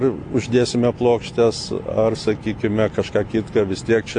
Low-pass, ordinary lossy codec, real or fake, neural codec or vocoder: 9.9 kHz; AAC, 48 kbps; real; none